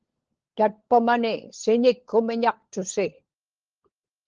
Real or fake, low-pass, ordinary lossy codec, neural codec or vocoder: fake; 7.2 kHz; Opus, 16 kbps; codec, 16 kHz, 16 kbps, FunCodec, trained on LibriTTS, 50 frames a second